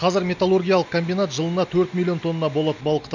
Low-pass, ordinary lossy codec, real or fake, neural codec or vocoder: 7.2 kHz; none; real; none